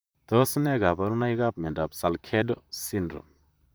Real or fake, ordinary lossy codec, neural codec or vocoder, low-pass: fake; none; vocoder, 44.1 kHz, 128 mel bands, Pupu-Vocoder; none